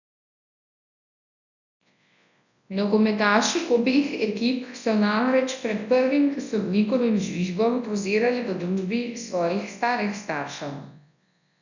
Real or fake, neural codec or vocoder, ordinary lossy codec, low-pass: fake; codec, 24 kHz, 0.9 kbps, WavTokenizer, large speech release; none; 7.2 kHz